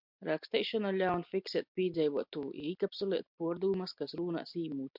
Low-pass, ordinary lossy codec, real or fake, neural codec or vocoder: 5.4 kHz; MP3, 48 kbps; real; none